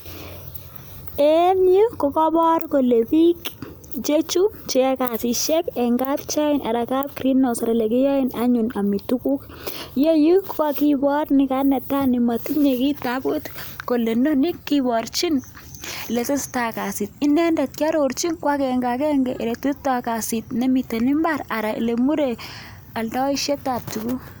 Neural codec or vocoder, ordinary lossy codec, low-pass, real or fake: none; none; none; real